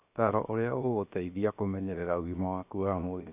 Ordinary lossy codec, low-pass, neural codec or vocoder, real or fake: none; 3.6 kHz; codec, 16 kHz, 0.7 kbps, FocalCodec; fake